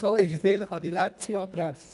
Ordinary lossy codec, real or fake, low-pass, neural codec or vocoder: none; fake; 10.8 kHz; codec, 24 kHz, 1.5 kbps, HILCodec